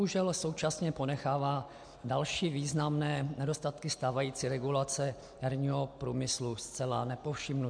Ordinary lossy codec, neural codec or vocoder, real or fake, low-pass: MP3, 64 kbps; none; real; 9.9 kHz